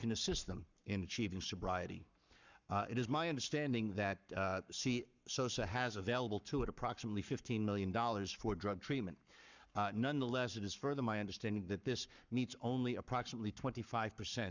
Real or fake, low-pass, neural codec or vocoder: fake; 7.2 kHz; codec, 44.1 kHz, 7.8 kbps, Pupu-Codec